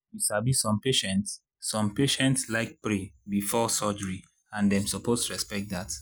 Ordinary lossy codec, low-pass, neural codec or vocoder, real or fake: none; none; none; real